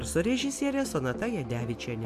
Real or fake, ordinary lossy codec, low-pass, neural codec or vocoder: real; MP3, 64 kbps; 14.4 kHz; none